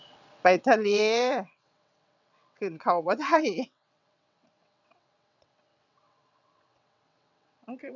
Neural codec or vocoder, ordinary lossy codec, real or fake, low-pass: vocoder, 22.05 kHz, 80 mel bands, WaveNeXt; none; fake; 7.2 kHz